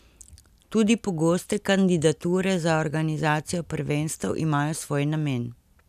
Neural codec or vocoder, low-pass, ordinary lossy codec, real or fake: none; 14.4 kHz; none; real